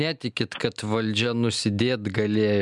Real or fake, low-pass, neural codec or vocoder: real; 10.8 kHz; none